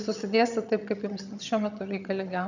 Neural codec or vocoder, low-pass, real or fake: vocoder, 22.05 kHz, 80 mel bands, HiFi-GAN; 7.2 kHz; fake